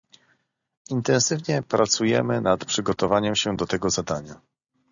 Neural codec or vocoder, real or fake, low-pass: none; real; 7.2 kHz